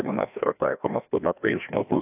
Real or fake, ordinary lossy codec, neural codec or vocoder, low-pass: fake; AAC, 32 kbps; codec, 16 kHz, 1 kbps, FreqCodec, larger model; 3.6 kHz